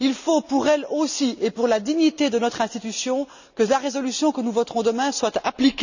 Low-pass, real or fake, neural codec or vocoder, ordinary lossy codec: 7.2 kHz; real; none; none